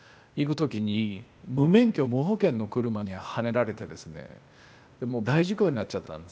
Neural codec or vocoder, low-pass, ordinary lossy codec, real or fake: codec, 16 kHz, 0.8 kbps, ZipCodec; none; none; fake